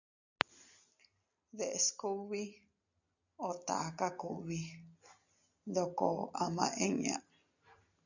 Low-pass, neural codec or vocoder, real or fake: 7.2 kHz; none; real